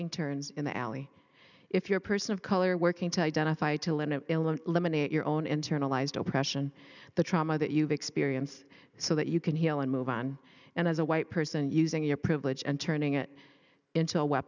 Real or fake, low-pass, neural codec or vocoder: real; 7.2 kHz; none